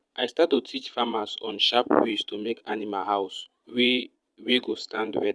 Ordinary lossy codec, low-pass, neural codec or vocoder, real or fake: none; none; vocoder, 22.05 kHz, 80 mel bands, Vocos; fake